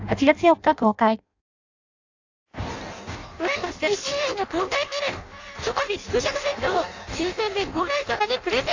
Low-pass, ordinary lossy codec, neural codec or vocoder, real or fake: 7.2 kHz; AAC, 48 kbps; codec, 16 kHz in and 24 kHz out, 0.6 kbps, FireRedTTS-2 codec; fake